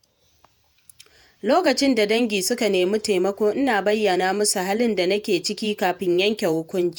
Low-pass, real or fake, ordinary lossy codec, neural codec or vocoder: none; fake; none; vocoder, 48 kHz, 128 mel bands, Vocos